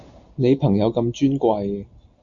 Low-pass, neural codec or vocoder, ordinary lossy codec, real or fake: 7.2 kHz; none; Opus, 64 kbps; real